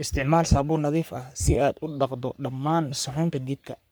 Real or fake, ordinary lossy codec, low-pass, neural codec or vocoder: fake; none; none; codec, 44.1 kHz, 3.4 kbps, Pupu-Codec